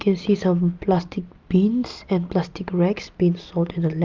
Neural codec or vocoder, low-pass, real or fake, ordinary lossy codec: none; 7.2 kHz; real; Opus, 24 kbps